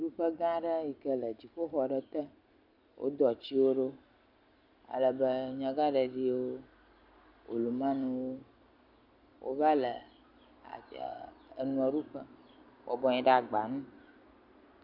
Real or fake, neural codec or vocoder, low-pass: real; none; 5.4 kHz